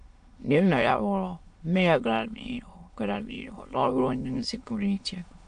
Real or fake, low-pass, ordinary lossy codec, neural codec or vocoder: fake; 9.9 kHz; AAC, 64 kbps; autoencoder, 22.05 kHz, a latent of 192 numbers a frame, VITS, trained on many speakers